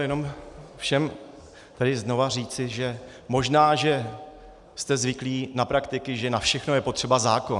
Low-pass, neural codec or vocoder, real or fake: 10.8 kHz; none; real